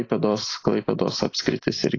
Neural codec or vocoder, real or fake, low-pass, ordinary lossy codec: none; real; 7.2 kHz; AAC, 32 kbps